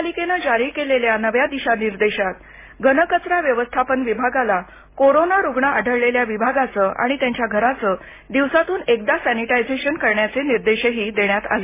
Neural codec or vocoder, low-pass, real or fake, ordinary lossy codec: none; 3.6 kHz; real; MP3, 16 kbps